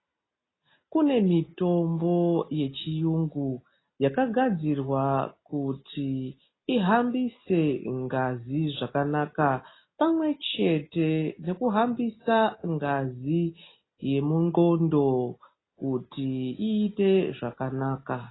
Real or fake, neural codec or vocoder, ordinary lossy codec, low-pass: real; none; AAC, 16 kbps; 7.2 kHz